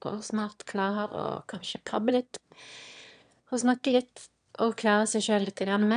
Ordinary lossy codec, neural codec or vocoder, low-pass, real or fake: none; autoencoder, 22.05 kHz, a latent of 192 numbers a frame, VITS, trained on one speaker; 9.9 kHz; fake